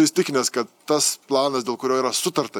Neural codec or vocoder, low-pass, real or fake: none; 19.8 kHz; real